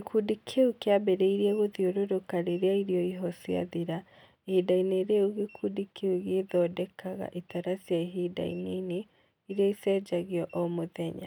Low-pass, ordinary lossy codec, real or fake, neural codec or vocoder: 19.8 kHz; none; real; none